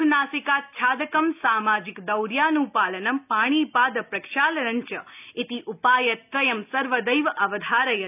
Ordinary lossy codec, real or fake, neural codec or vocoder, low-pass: none; real; none; 3.6 kHz